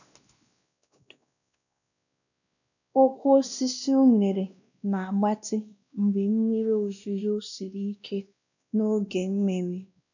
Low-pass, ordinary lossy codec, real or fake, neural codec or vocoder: 7.2 kHz; none; fake; codec, 16 kHz, 1 kbps, X-Codec, WavLM features, trained on Multilingual LibriSpeech